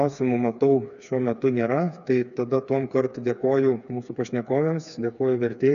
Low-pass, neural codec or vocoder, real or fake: 7.2 kHz; codec, 16 kHz, 4 kbps, FreqCodec, smaller model; fake